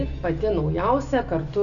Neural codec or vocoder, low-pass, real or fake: none; 7.2 kHz; real